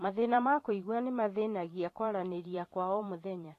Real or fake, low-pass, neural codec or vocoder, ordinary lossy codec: fake; 14.4 kHz; vocoder, 44.1 kHz, 128 mel bands every 512 samples, BigVGAN v2; AAC, 48 kbps